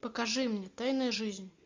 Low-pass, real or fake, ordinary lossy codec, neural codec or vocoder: 7.2 kHz; real; MP3, 64 kbps; none